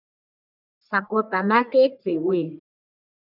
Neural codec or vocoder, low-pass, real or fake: codec, 44.1 kHz, 1.7 kbps, Pupu-Codec; 5.4 kHz; fake